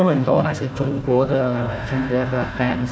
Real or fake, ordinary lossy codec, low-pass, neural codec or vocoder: fake; none; none; codec, 16 kHz, 1 kbps, FunCodec, trained on Chinese and English, 50 frames a second